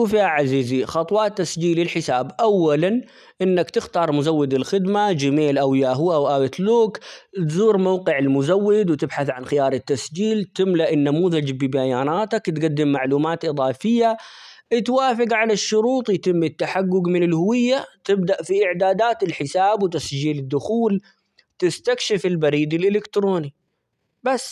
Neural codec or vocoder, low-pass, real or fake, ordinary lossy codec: none; 14.4 kHz; real; none